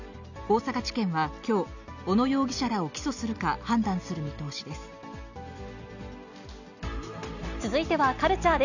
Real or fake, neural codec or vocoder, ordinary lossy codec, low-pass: real; none; none; 7.2 kHz